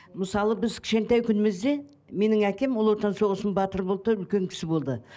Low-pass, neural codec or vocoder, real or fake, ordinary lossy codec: none; none; real; none